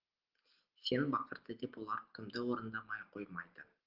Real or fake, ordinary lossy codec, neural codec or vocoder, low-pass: real; Opus, 16 kbps; none; 5.4 kHz